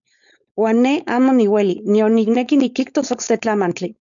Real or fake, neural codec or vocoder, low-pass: fake; codec, 16 kHz, 4.8 kbps, FACodec; 7.2 kHz